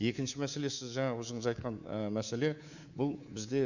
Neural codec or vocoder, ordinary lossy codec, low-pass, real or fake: codec, 24 kHz, 3.1 kbps, DualCodec; none; 7.2 kHz; fake